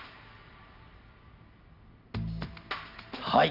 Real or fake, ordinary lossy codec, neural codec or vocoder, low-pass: real; none; none; 5.4 kHz